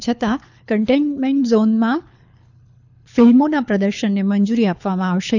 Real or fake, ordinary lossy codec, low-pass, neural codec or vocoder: fake; none; 7.2 kHz; codec, 24 kHz, 6 kbps, HILCodec